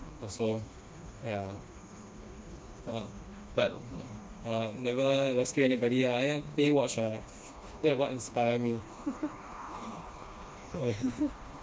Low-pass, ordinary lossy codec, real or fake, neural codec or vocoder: none; none; fake; codec, 16 kHz, 2 kbps, FreqCodec, smaller model